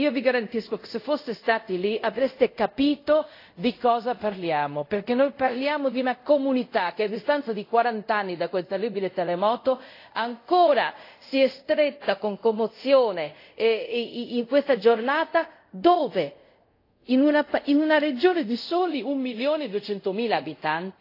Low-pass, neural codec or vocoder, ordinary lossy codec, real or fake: 5.4 kHz; codec, 24 kHz, 0.5 kbps, DualCodec; AAC, 32 kbps; fake